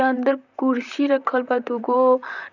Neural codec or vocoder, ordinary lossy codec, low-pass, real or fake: vocoder, 44.1 kHz, 128 mel bands, Pupu-Vocoder; none; 7.2 kHz; fake